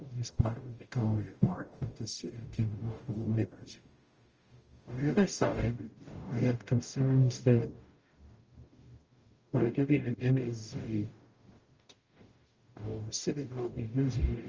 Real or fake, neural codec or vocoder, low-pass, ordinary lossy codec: fake; codec, 44.1 kHz, 0.9 kbps, DAC; 7.2 kHz; Opus, 24 kbps